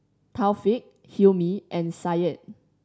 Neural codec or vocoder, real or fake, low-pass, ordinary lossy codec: none; real; none; none